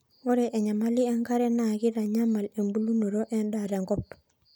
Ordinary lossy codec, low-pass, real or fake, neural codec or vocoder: none; none; real; none